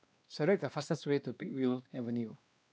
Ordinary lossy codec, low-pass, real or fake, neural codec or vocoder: none; none; fake; codec, 16 kHz, 2 kbps, X-Codec, WavLM features, trained on Multilingual LibriSpeech